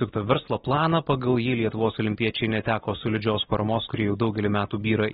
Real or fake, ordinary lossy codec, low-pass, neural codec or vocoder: real; AAC, 16 kbps; 19.8 kHz; none